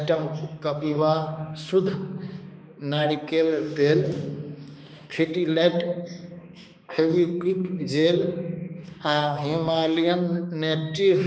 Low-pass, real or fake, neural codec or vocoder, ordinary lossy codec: none; fake; codec, 16 kHz, 2 kbps, X-Codec, HuBERT features, trained on balanced general audio; none